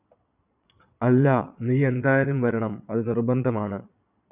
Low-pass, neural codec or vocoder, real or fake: 3.6 kHz; vocoder, 22.05 kHz, 80 mel bands, Vocos; fake